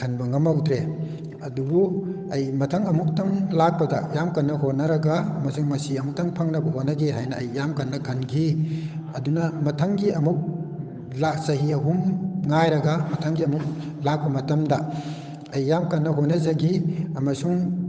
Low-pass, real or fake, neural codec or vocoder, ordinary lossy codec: none; fake; codec, 16 kHz, 8 kbps, FunCodec, trained on Chinese and English, 25 frames a second; none